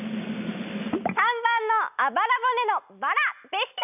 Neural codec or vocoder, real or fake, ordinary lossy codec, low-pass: none; real; none; 3.6 kHz